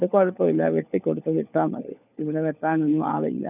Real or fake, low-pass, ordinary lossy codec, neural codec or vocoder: fake; 3.6 kHz; none; codec, 16 kHz, 4 kbps, FunCodec, trained on LibriTTS, 50 frames a second